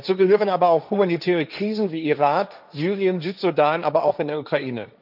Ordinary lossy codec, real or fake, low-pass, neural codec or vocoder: none; fake; 5.4 kHz; codec, 16 kHz, 1.1 kbps, Voila-Tokenizer